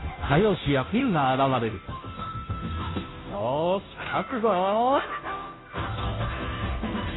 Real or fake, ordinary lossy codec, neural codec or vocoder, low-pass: fake; AAC, 16 kbps; codec, 16 kHz, 0.5 kbps, FunCodec, trained on Chinese and English, 25 frames a second; 7.2 kHz